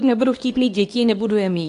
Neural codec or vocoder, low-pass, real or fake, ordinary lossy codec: codec, 24 kHz, 0.9 kbps, WavTokenizer, medium speech release version 2; 10.8 kHz; fake; AAC, 64 kbps